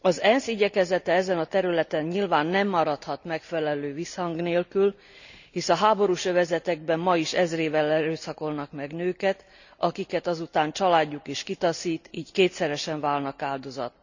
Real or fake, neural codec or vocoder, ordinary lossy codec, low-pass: real; none; none; 7.2 kHz